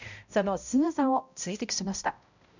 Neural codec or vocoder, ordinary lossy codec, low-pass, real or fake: codec, 16 kHz, 0.5 kbps, X-Codec, HuBERT features, trained on balanced general audio; none; 7.2 kHz; fake